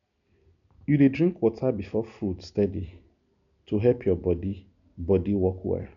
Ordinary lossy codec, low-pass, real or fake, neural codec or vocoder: none; 7.2 kHz; real; none